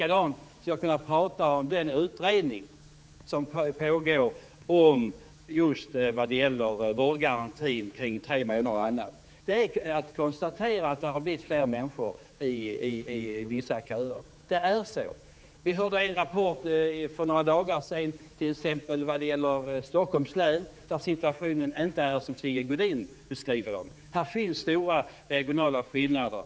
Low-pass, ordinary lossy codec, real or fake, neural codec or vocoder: none; none; fake; codec, 16 kHz, 4 kbps, X-Codec, HuBERT features, trained on general audio